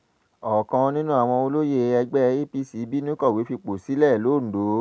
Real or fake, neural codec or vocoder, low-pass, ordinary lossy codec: real; none; none; none